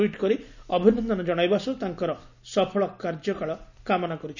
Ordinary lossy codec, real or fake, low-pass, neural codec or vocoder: none; real; 7.2 kHz; none